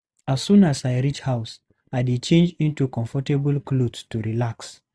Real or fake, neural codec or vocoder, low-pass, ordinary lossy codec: real; none; none; none